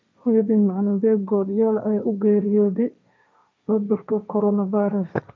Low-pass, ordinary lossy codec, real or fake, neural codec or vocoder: none; none; fake; codec, 16 kHz, 1.1 kbps, Voila-Tokenizer